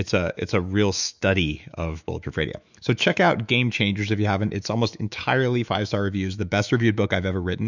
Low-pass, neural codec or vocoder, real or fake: 7.2 kHz; autoencoder, 48 kHz, 128 numbers a frame, DAC-VAE, trained on Japanese speech; fake